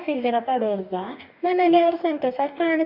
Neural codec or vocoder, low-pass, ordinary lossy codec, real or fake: codec, 44.1 kHz, 2.6 kbps, DAC; 5.4 kHz; none; fake